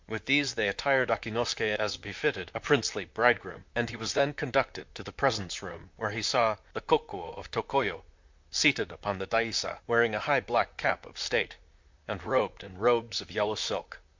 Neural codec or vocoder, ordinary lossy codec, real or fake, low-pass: vocoder, 44.1 kHz, 128 mel bands, Pupu-Vocoder; MP3, 64 kbps; fake; 7.2 kHz